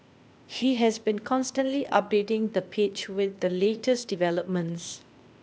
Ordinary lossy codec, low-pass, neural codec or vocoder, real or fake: none; none; codec, 16 kHz, 0.8 kbps, ZipCodec; fake